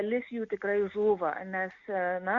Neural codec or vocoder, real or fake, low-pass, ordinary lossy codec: none; real; 7.2 kHz; Opus, 64 kbps